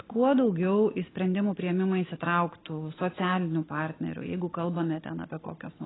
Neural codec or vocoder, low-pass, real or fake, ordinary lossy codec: none; 7.2 kHz; real; AAC, 16 kbps